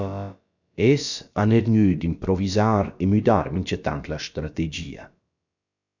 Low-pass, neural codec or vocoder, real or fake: 7.2 kHz; codec, 16 kHz, about 1 kbps, DyCAST, with the encoder's durations; fake